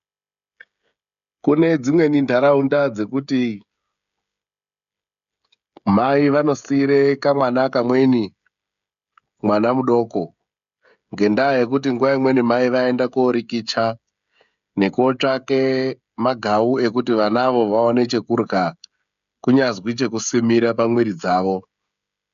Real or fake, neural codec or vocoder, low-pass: fake; codec, 16 kHz, 16 kbps, FreqCodec, smaller model; 7.2 kHz